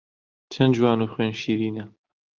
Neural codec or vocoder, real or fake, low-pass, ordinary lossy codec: none; real; 7.2 kHz; Opus, 24 kbps